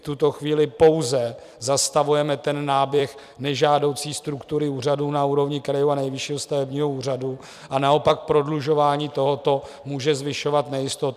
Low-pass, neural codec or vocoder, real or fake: 14.4 kHz; vocoder, 44.1 kHz, 128 mel bands every 256 samples, BigVGAN v2; fake